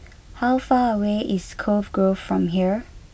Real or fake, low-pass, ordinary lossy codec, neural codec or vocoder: real; none; none; none